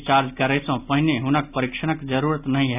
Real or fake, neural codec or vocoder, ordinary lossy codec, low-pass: real; none; none; 3.6 kHz